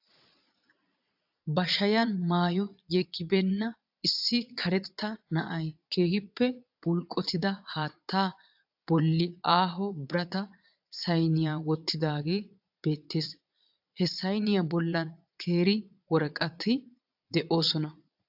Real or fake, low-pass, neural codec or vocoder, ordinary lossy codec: fake; 5.4 kHz; vocoder, 22.05 kHz, 80 mel bands, Vocos; AAC, 48 kbps